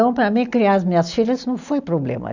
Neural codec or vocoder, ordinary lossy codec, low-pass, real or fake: none; none; 7.2 kHz; real